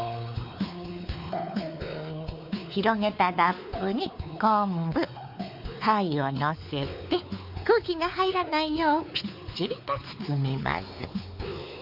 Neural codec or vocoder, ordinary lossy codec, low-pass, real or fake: codec, 16 kHz, 4 kbps, X-Codec, WavLM features, trained on Multilingual LibriSpeech; none; 5.4 kHz; fake